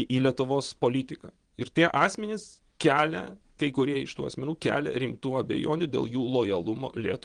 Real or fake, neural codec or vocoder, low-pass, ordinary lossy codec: fake; vocoder, 22.05 kHz, 80 mel bands, WaveNeXt; 9.9 kHz; Opus, 24 kbps